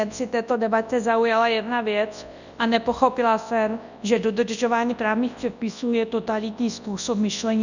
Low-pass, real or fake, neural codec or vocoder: 7.2 kHz; fake; codec, 24 kHz, 0.9 kbps, WavTokenizer, large speech release